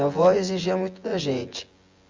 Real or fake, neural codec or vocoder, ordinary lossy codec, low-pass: fake; vocoder, 24 kHz, 100 mel bands, Vocos; Opus, 32 kbps; 7.2 kHz